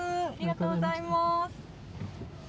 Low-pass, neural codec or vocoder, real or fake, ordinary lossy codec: none; none; real; none